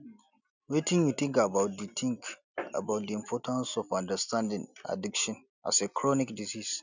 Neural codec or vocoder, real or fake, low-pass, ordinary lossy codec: none; real; 7.2 kHz; none